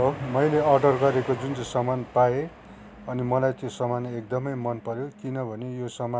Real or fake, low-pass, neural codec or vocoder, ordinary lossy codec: real; none; none; none